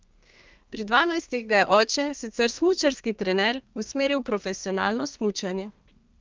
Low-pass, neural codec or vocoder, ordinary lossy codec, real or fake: 7.2 kHz; codec, 32 kHz, 1.9 kbps, SNAC; Opus, 24 kbps; fake